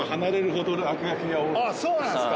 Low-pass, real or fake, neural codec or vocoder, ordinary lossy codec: none; real; none; none